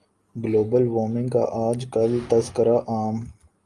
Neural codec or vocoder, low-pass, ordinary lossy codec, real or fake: none; 10.8 kHz; Opus, 32 kbps; real